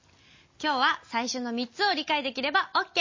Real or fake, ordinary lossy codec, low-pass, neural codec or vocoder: real; MP3, 32 kbps; 7.2 kHz; none